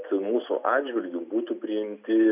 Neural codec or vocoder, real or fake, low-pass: none; real; 3.6 kHz